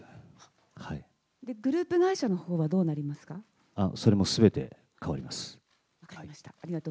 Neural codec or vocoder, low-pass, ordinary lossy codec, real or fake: none; none; none; real